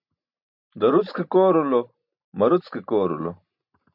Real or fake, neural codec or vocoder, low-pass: real; none; 5.4 kHz